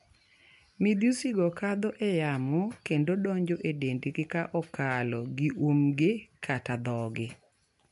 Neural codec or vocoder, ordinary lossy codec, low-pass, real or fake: none; none; 10.8 kHz; real